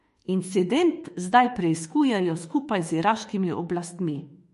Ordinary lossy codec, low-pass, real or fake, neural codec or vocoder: MP3, 48 kbps; 14.4 kHz; fake; autoencoder, 48 kHz, 32 numbers a frame, DAC-VAE, trained on Japanese speech